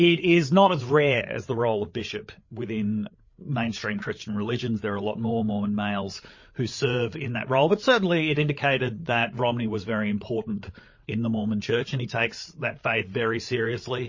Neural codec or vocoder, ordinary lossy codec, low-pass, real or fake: codec, 16 kHz, 8 kbps, FreqCodec, larger model; MP3, 32 kbps; 7.2 kHz; fake